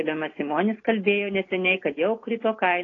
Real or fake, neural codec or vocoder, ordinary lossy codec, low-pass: real; none; AAC, 32 kbps; 7.2 kHz